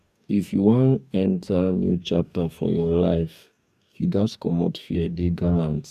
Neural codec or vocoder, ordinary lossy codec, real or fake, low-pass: codec, 44.1 kHz, 2.6 kbps, DAC; none; fake; 14.4 kHz